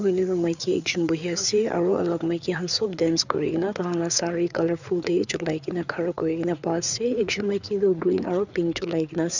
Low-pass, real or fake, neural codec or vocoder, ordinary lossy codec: 7.2 kHz; fake; codec, 16 kHz, 4 kbps, FreqCodec, larger model; none